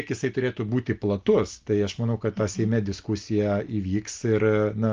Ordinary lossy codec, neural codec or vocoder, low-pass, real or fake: Opus, 32 kbps; none; 7.2 kHz; real